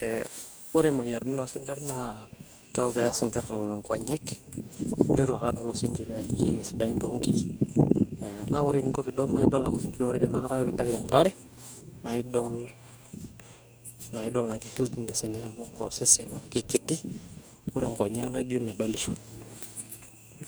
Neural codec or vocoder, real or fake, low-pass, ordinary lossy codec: codec, 44.1 kHz, 2.6 kbps, DAC; fake; none; none